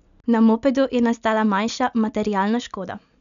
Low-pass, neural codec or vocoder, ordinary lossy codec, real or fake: 7.2 kHz; none; none; real